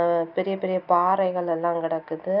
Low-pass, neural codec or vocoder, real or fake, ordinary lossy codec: 5.4 kHz; none; real; none